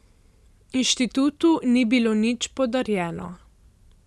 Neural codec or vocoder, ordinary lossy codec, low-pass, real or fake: none; none; none; real